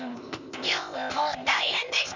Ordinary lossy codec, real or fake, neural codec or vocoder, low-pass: none; fake; codec, 16 kHz, 0.8 kbps, ZipCodec; 7.2 kHz